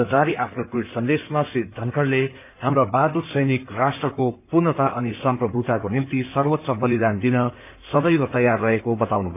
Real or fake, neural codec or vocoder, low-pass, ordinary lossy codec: fake; codec, 16 kHz in and 24 kHz out, 2.2 kbps, FireRedTTS-2 codec; 3.6 kHz; none